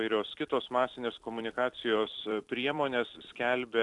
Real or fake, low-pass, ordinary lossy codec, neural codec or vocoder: fake; 10.8 kHz; AAC, 64 kbps; vocoder, 44.1 kHz, 128 mel bands every 256 samples, BigVGAN v2